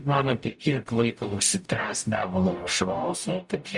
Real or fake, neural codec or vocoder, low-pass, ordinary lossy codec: fake; codec, 44.1 kHz, 0.9 kbps, DAC; 10.8 kHz; Opus, 64 kbps